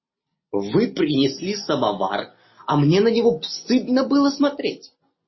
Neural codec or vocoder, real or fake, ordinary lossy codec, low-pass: none; real; MP3, 24 kbps; 7.2 kHz